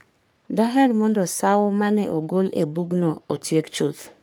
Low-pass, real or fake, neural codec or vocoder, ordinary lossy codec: none; fake; codec, 44.1 kHz, 3.4 kbps, Pupu-Codec; none